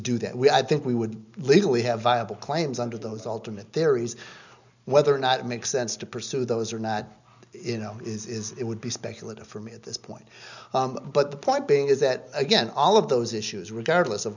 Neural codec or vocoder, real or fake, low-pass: none; real; 7.2 kHz